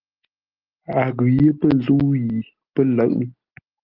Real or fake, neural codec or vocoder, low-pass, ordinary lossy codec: real; none; 5.4 kHz; Opus, 32 kbps